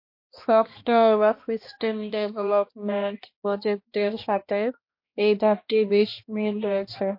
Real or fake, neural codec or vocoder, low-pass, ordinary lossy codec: fake; codec, 16 kHz, 1 kbps, X-Codec, HuBERT features, trained on balanced general audio; 5.4 kHz; MP3, 32 kbps